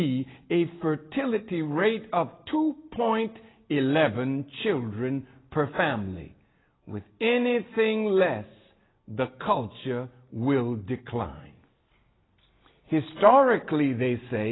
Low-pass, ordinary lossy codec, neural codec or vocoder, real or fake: 7.2 kHz; AAC, 16 kbps; none; real